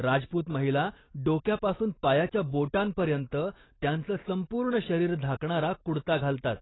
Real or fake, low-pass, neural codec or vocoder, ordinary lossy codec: real; 7.2 kHz; none; AAC, 16 kbps